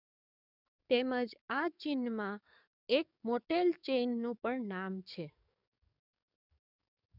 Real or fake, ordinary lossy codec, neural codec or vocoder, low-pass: fake; none; codec, 44.1 kHz, 7.8 kbps, DAC; 5.4 kHz